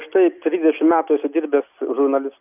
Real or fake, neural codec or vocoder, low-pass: real; none; 3.6 kHz